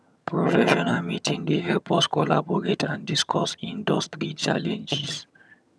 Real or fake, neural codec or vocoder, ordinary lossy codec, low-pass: fake; vocoder, 22.05 kHz, 80 mel bands, HiFi-GAN; none; none